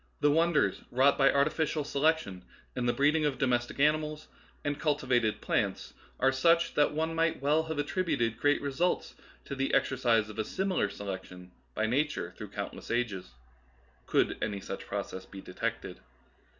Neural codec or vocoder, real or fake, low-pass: none; real; 7.2 kHz